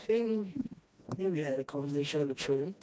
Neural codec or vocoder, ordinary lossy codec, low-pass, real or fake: codec, 16 kHz, 1 kbps, FreqCodec, smaller model; none; none; fake